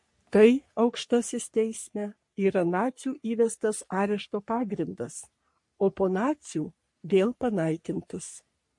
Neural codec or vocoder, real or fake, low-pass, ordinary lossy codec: codec, 44.1 kHz, 3.4 kbps, Pupu-Codec; fake; 10.8 kHz; MP3, 48 kbps